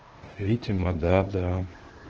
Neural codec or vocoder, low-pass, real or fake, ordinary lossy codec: codec, 16 kHz, 0.8 kbps, ZipCodec; 7.2 kHz; fake; Opus, 16 kbps